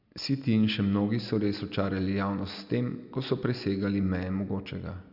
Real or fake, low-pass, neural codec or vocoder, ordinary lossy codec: real; 5.4 kHz; none; none